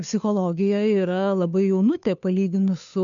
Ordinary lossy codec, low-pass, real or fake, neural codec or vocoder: MP3, 96 kbps; 7.2 kHz; fake; codec, 16 kHz, 2 kbps, FunCodec, trained on Chinese and English, 25 frames a second